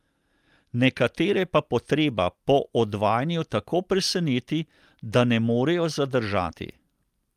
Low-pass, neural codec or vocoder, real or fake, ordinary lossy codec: 14.4 kHz; none; real; Opus, 32 kbps